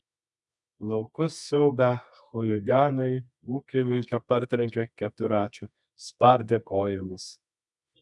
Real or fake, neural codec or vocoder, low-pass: fake; codec, 24 kHz, 0.9 kbps, WavTokenizer, medium music audio release; 10.8 kHz